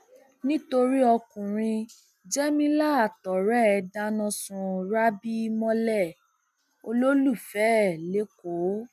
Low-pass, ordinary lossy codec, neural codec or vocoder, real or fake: 14.4 kHz; none; none; real